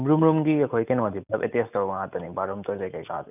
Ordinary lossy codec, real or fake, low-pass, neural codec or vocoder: none; real; 3.6 kHz; none